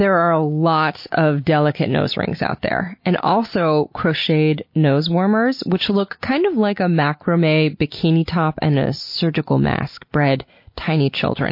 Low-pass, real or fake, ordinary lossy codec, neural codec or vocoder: 5.4 kHz; real; MP3, 32 kbps; none